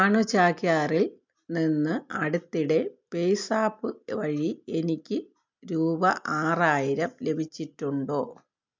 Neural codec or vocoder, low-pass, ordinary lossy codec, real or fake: none; 7.2 kHz; MP3, 64 kbps; real